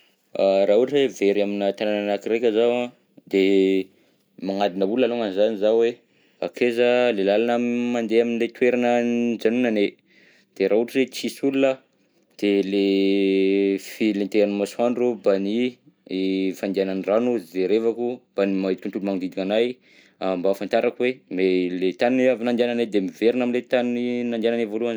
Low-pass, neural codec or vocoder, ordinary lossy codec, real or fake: none; none; none; real